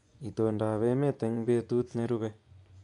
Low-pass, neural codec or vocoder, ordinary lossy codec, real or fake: 10.8 kHz; vocoder, 24 kHz, 100 mel bands, Vocos; MP3, 96 kbps; fake